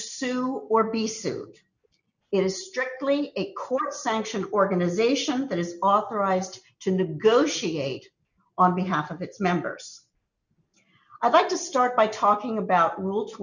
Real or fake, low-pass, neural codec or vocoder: real; 7.2 kHz; none